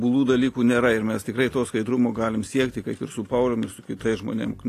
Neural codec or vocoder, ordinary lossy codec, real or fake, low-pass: none; AAC, 48 kbps; real; 14.4 kHz